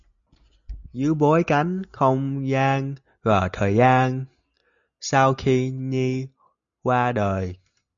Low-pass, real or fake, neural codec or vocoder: 7.2 kHz; real; none